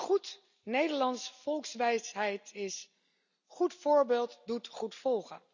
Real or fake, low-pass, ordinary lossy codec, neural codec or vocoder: real; 7.2 kHz; none; none